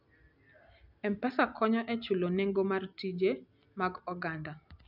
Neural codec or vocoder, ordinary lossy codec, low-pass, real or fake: none; none; 5.4 kHz; real